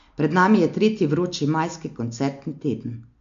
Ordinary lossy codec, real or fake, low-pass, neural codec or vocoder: MP3, 48 kbps; real; 7.2 kHz; none